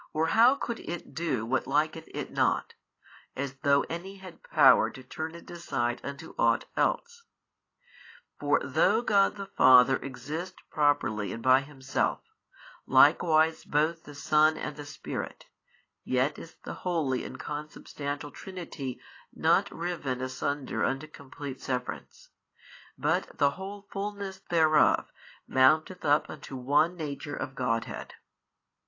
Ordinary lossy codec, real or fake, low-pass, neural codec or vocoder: AAC, 32 kbps; real; 7.2 kHz; none